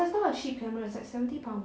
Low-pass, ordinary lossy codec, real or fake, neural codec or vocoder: none; none; real; none